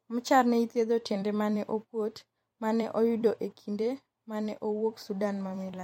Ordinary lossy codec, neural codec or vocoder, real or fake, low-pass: MP3, 64 kbps; vocoder, 44.1 kHz, 128 mel bands every 256 samples, BigVGAN v2; fake; 19.8 kHz